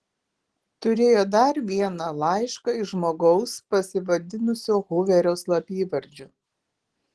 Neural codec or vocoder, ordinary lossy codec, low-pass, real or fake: none; Opus, 16 kbps; 10.8 kHz; real